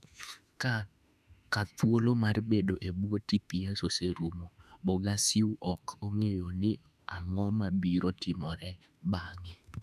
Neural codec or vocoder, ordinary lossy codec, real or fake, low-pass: autoencoder, 48 kHz, 32 numbers a frame, DAC-VAE, trained on Japanese speech; none; fake; 14.4 kHz